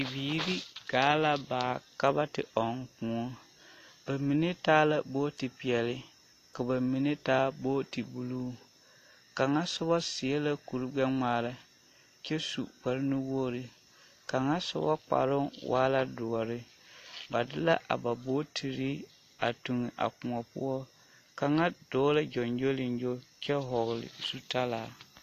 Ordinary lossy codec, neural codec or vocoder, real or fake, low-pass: AAC, 48 kbps; none; real; 14.4 kHz